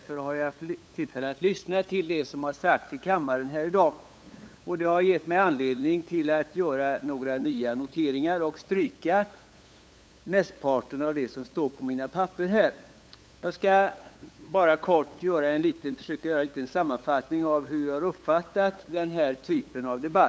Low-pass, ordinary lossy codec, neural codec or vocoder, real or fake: none; none; codec, 16 kHz, 8 kbps, FunCodec, trained on LibriTTS, 25 frames a second; fake